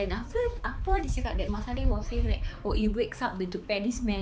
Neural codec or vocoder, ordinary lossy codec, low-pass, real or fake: codec, 16 kHz, 4 kbps, X-Codec, HuBERT features, trained on balanced general audio; none; none; fake